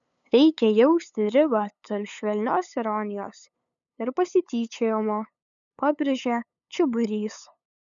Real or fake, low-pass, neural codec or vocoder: fake; 7.2 kHz; codec, 16 kHz, 8 kbps, FunCodec, trained on LibriTTS, 25 frames a second